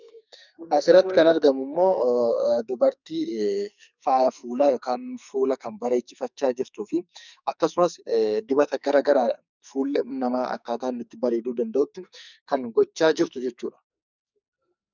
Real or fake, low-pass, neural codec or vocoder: fake; 7.2 kHz; codec, 44.1 kHz, 2.6 kbps, SNAC